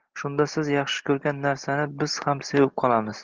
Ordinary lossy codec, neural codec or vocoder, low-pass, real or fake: Opus, 16 kbps; none; 7.2 kHz; real